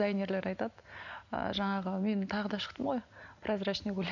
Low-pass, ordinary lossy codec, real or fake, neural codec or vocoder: 7.2 kHz; none; real; none